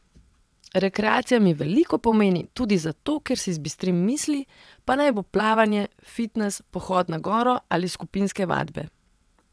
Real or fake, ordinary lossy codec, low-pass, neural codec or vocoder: fake; none; none; vocoder, 22.05 kHz, 80 mel bands, WaveNeXt